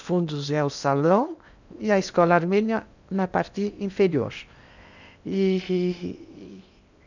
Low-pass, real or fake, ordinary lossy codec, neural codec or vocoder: 7.2 kHz; fake; none; codec, 16 kHz in and 24 kHz out, 0.8 kbps, FocalCodec, streaming, 65536 codes